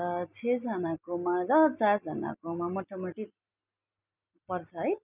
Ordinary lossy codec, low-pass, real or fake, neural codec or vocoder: none; 3.6 kHz; real; none